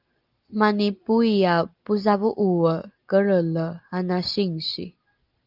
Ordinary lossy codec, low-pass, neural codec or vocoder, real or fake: Opus, 32 kbps; 5.4 kHz; none; real